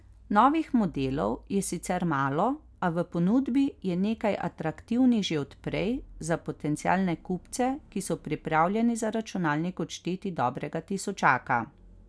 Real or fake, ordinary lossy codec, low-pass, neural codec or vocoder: real; none; none; none